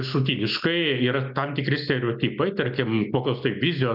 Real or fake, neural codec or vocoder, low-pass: real; none; 5.4 kHz